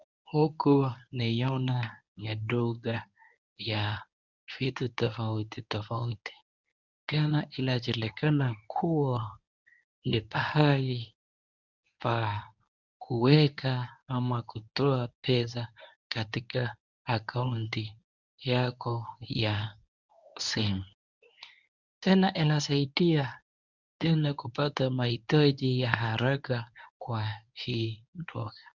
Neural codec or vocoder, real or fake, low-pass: codec, 24 kHz, 0.9 kbps, WavTokenizer, medium speech release version 1; fake; 7.2 kHz